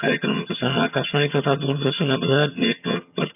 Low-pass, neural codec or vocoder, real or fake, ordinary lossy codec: 3.6 kHz; vocoder, 22.05 kHz, 80 mel bands, HiFi-GAN; fake; none